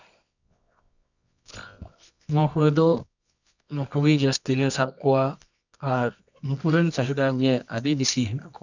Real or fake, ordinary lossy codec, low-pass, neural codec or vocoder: fake; none; 7.2 kHz; codec, 24 kHz, 0.9 kbps, WavTokenizer, medium music audio release